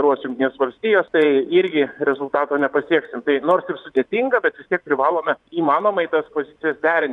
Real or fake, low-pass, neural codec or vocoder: fake; 10.8 kHz; vocoder, 24 kHz, 100 mel bands, Vocos